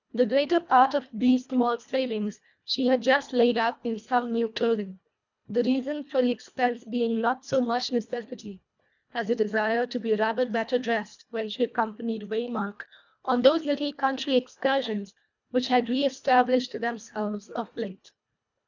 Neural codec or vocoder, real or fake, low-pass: codec, 24 kHz, 1.5 kbps, HILCodec; fake; 7.2 kHz